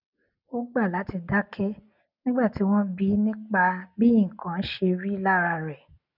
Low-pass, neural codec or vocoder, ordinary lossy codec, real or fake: 5.4 kHz; none; none; real